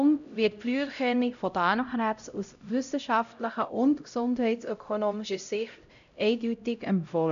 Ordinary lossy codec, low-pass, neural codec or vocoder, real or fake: none; 7.2 kHz; codec, 16 kHz, 0.5 kbps, X-Codec, HuBERT features, trained on LibriSpeech; fake